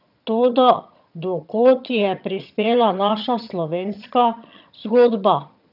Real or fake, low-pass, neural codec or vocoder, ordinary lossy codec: fake; 5.4 kHz; vocoder, 22.05 kHz, 80 mel bands, HiFi-GAN; none